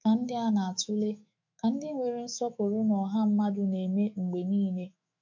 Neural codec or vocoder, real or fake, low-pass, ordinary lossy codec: autoencoder, 48 kHz, 128 numbers a frame, DAC-VAE, trained on Japanese speech; fake; 7.2 kHz; MP3, 64 kbps